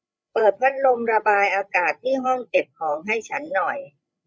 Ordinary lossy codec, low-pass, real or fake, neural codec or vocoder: none; none; fake; codec, 16 kHz, 8 kbps, FreqCodec, larger model